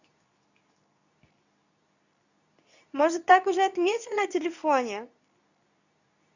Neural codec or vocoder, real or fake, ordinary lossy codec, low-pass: codec, 24 kHz, 0.9 kbps, WavTokenizer, medium speech release version 2; fake; none; 7.2 kHz